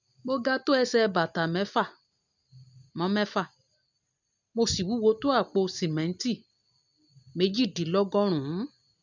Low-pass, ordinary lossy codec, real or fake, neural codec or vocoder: 7.2 kHz; none; real; none